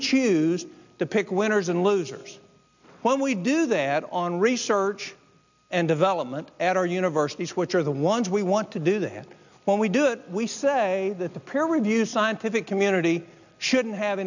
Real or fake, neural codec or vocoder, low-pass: real; none; 7.2 kHz